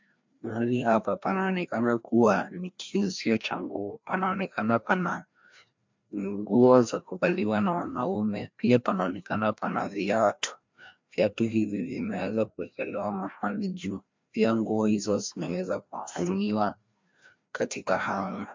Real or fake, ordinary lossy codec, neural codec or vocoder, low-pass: fake; MP3, 64 kbps; codec, 16 kHz, 1 kbps, FreqCodec, larger model; 7.2 kHz